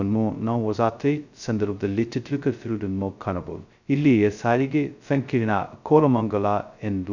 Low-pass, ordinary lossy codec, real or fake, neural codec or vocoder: 7.2 kHz; none; fake; codec, 16 kHz, 0.2 kbps, FocalCodec